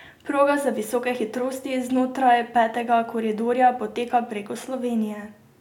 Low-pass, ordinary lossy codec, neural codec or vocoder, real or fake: 19.8 kHz; none; none; real